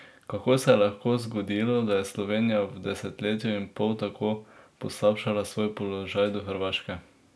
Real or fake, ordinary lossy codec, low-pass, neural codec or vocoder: real; none; none; none